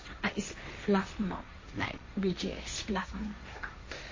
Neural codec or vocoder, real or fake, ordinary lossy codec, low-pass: codec, 16 kHz, 1.1 kbps, Voila-Tokenizer; fake; MP3, 32 kbps; 7.2 kHz